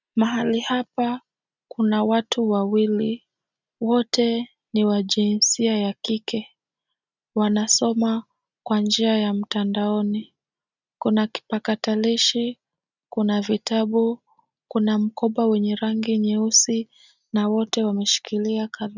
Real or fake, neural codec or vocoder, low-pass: real; none; 7.2 kHz